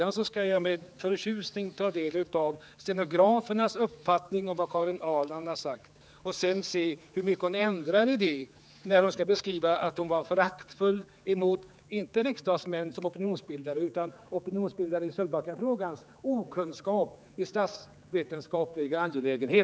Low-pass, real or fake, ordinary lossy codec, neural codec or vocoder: none; fake; none; codec, 16 kHz, 4 kbps, X-Codec, HuBERT features, trained on general audio